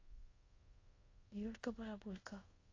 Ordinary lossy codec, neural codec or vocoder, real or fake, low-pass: AAC, 32 kbps; codec, 24 kHz, 0.5 kbps, DualCodec; fake; 7.2 kHz